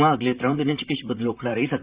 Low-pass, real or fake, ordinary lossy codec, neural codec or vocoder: 3.6 kHz; fake; Opus, 64 kbps; vocoder, 44.1 kHz, 128 mel bands, Pupu-Vocoder